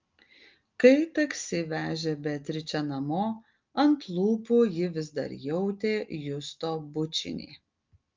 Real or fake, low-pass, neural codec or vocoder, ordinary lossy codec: real; 7.2 kHz; none; Opus, 24 kbps